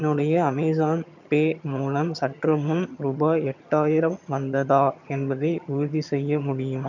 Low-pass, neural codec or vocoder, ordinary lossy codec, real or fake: 7.2 kHz; vocoder, 22.05 kHz, 80 mel bands, HiFi-GAN; none; fake